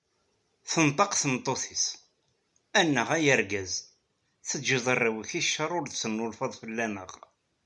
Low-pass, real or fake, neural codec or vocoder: 10.8 kHz; real; none